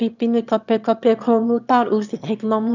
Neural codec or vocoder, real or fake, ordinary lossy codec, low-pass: autoencoder, 22.05 kHz, a latent of 192 numbers a frame, VITS, trained on one speaker; fake; none; 7.2 kHz